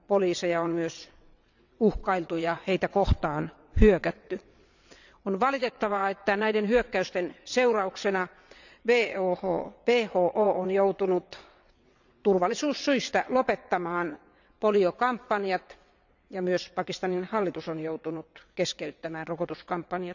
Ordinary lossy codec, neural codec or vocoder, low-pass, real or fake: none; vocoder, 22.05 kHz, 80 mel bands, WaveNeXt; 7.2 kHz; fake